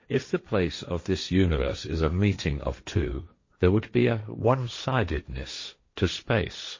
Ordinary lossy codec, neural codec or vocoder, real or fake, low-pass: MP3, 32 kbps; codec, 16 kHz, 1.1 kbps, Voila-Tokenizer; fake; 7.2 kHz